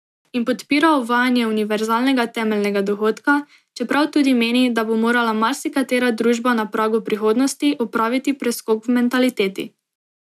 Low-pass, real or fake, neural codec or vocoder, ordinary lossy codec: 14.4 kHz; real; none; none